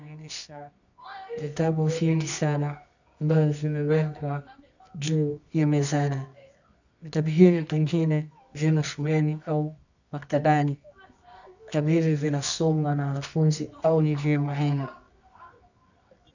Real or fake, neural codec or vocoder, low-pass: fake; codec, 24 kHz, 0.9 kbps, WavTokenizer, medium music audio release; 7.2 kHz